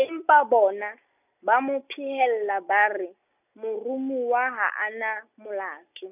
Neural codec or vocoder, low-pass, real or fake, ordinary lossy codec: none; 3.6 kHz; real; none